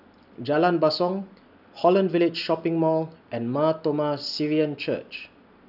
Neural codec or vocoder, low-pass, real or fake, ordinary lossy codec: none; 5.4 kHz; real; none